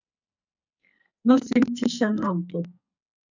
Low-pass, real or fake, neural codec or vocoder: 7.2 kHz; fake; codec, 44.1 kHz, 2.6 kbps, SNAC